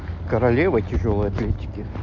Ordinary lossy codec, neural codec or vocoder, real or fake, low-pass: MP3, 48 kbps; none; real; 7.2 kHz